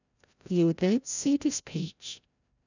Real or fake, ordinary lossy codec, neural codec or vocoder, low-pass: fake; none; codec, 16 kHz, 0.5 kbps, FreqCodec, larger model; 7.2 kHz